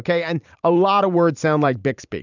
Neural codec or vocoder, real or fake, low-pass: vocoder, 44.1 kHz, 128 mel bands every 512 samples, BigVGAN v2; fake; 7.2 kHz